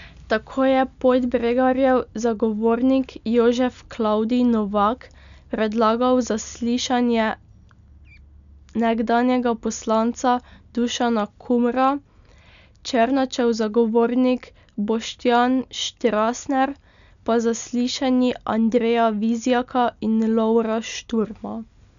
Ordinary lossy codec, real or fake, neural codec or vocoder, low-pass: none; real; none; 7.2 kHz